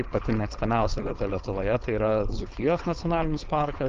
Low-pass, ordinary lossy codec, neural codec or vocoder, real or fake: 7.2 kHz; Opus, 24 kbps; codec, 16 kHz, 4.8 kbps, FACodec; fake